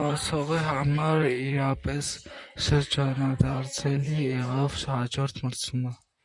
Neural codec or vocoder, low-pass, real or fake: vocoder, 44.1 kHz, 128 mel bands, Pupu-Vocoder; 10.8 kHz; fake